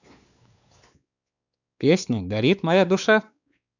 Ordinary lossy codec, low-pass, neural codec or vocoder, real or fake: none; 7.2 kHz; codec, 16 kHz, 4 kbps, X-Codec, WavLM features, trained on Multilingual LibriSpeech; fake